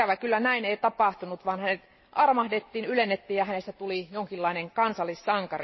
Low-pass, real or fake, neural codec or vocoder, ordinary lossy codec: 7.2 kHz; real; none; MP3, 24 kbps